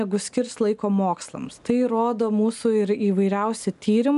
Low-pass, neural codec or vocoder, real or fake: 10.8 kHz; none; real